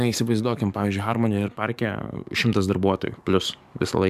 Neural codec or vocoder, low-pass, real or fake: codec, 44.1 kHz, 7.8 kbps, DAC; 14.4 kHz; fake